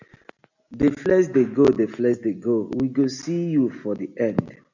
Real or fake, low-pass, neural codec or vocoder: real; 7.2 kHz; none